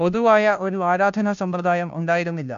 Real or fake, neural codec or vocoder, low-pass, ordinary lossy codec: fake; codec, 16 kHz, 0.5 kbps, FunCodec, trained on Chinese and English, 25 frames a second; 7.2 kHz; MP3, 96 kbps